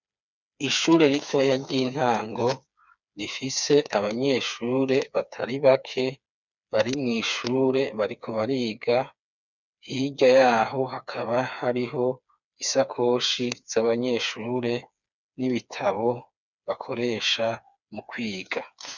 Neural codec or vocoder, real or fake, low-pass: codec, 16 kHz, 4 kbps, FreqCodec, smaller model; fake; 7.2 kHz